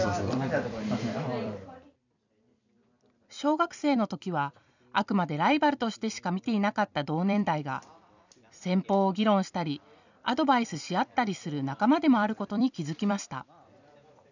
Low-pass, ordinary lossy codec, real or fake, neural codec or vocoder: 7.2 kHz; none; fake; vocoder, 44.1 kHz, 128 mel bands every 256 samples, BigVGAN v2